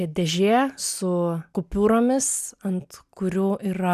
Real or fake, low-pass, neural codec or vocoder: real; 14.4 kHz; none